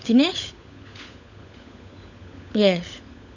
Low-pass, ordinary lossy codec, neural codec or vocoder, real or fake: 7.2 kHz; none; codec, 16 kHz, 16 kbps, FunCodec, trained on LibriTTS, 50 frames a second; fake